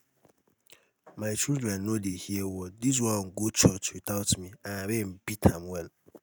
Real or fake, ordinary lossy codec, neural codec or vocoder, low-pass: real; none; none; none